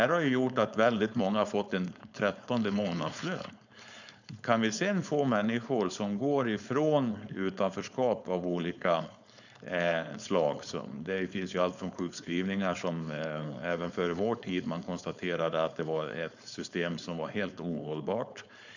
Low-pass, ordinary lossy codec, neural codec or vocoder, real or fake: 7.2 kHz; none; codec, 16 kHz, 4.8 kbps, FACodec; fake